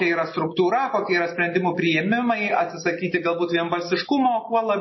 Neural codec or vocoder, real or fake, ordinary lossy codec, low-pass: none; real; MP3, 24 kbps; 7.2 kHz